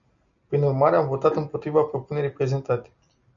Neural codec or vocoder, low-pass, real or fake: none; 7.2 kHz; real